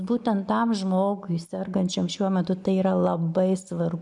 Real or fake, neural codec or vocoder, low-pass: real; none; 10.8 kHz